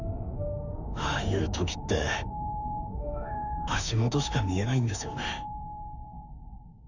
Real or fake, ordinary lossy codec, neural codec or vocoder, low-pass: fake; none; autoencoder, 48 kHz, 32 numbers a frame, DAC-VAE, trained on Japanese speech; 7.2 kHz